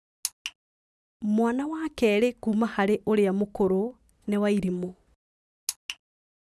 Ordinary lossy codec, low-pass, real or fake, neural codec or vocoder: none; none; real; none